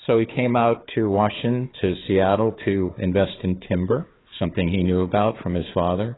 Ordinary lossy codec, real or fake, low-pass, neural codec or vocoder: AAC, 16 kbps; fake; 7.2 kHz; codec, 16 kHz, 4 kbps, FunCodec, trained on Chinese and English, 50 frames a second